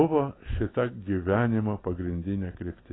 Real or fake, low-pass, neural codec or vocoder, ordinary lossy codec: real; 7.2 kHz; none; AAC, 16 kbps